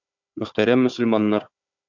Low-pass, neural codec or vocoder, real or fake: 7.2 kHz; codec, 16 kHz, 4 kbps, FunCodec, trained on Chinese and English, 50 frames a second; fake